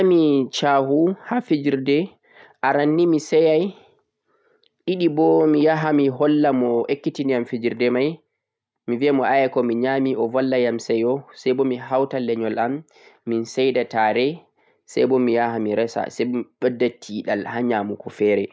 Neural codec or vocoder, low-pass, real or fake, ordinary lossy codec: none; none; real; none